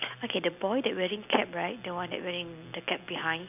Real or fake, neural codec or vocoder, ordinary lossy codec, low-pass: real; none; none; 3.6 kHz